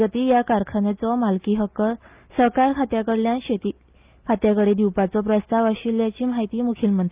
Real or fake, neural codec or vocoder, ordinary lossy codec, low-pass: real; none; Opus, 32 kbps; 3.6 kHz